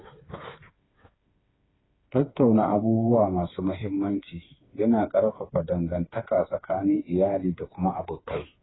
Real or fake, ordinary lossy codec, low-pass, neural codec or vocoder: fake; AAC, 16 kbps; 7.2 kHz; codec, 16 kHz, 4 kbps, FreqCodec, smaller model